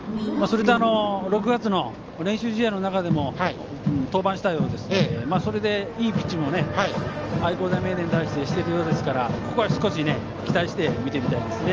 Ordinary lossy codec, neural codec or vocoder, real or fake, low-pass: Opus, 24 kbps; none; real; 7.2 kHz